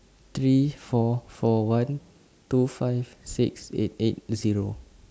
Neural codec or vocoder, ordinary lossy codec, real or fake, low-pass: none; none; real; none